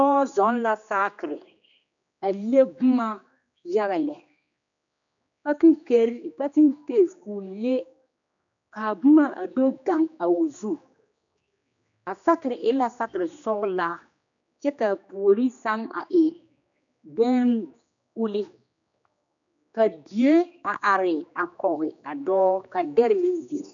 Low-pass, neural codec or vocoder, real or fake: 7.2 kHz; codec, 16 kHz, 2 kbps, X-Codec, HuBERT features, trained on general audio; fake